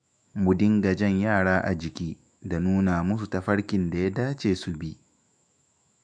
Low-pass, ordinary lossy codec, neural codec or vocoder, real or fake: 9.9 kHz; none; autoencoder, 48 kHz, 128 numbers a frame, DAC-VAE, trained on Japanese speech; fake